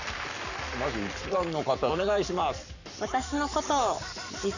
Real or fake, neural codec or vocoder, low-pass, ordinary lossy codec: fake; codec, 44.1 kHz, 7.8 kbps, Pupu-Codec; 7.2 kHz; none